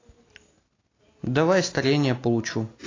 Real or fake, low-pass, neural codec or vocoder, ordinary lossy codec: real; 7.2 kHz; none; AAC, 32 kbps